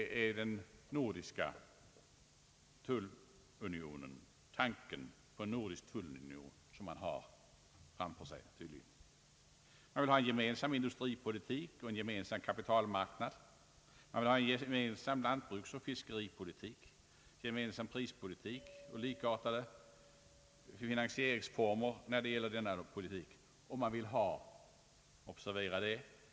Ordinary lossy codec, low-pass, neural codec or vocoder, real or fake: none; none; none; real